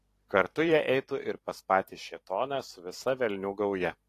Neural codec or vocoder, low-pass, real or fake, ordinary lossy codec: vocoder, 44.1 kHz, 128 mel bands every 512 samples, BigVGAN v2; 14.4 kHz; fake; AAC, 48 kbps